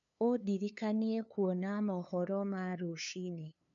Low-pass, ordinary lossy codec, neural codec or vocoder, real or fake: 7.2 kHz; none; codec, 16 kHz, 2 kbps, FunCodec, trained on LibriTTS, 25 frames a second; fake